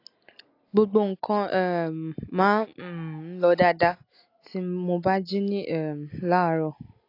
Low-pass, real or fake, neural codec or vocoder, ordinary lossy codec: 5.4 kHz; real; none; AAC, 32 kbps